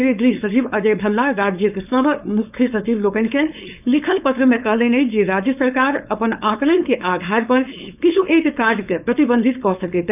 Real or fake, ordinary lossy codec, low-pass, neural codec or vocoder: fake; none; 3.6 kHz; codec, 16 kHz, 4.8 kbps, FACodec